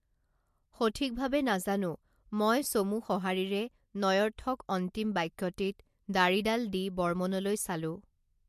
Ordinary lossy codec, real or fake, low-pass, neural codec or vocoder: MP3, 64 kbps; real; 14.4 kHz; none